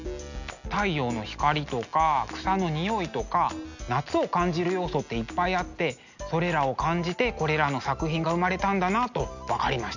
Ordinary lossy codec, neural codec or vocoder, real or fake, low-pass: none; none; real; 7.2 kHz